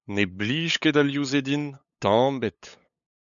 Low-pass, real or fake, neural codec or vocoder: 7.2 kHz; fake; codec, 16 kHz, 8 kbps, FunCodec, trained on LibriTTS, 25 frames a second